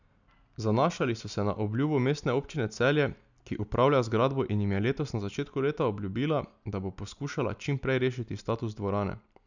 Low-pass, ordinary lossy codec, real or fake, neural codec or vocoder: 7.2 kHz; none; real; none